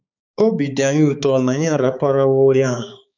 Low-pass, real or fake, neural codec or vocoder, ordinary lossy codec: 7.2 kHz; fake; codec, 16 kHz, 4 kbps, X-Codec, HuBERT features, trained on balanced general audio; none